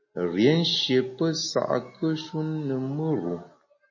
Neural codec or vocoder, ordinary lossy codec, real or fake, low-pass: none; MP3, 32 kbps; real; 7.2 kHz